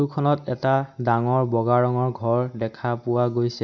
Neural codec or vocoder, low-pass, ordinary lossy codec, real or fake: none; 7.2 kHz; none; real